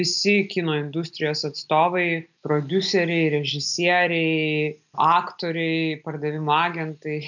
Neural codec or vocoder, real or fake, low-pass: none; real; 7.2 kHz